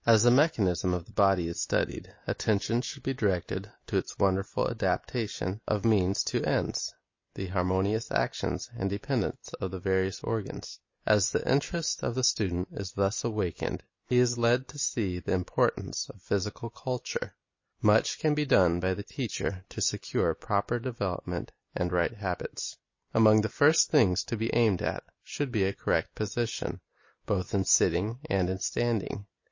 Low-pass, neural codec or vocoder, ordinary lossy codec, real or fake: 7.2 kHz; none; MP3, 32 kbps; real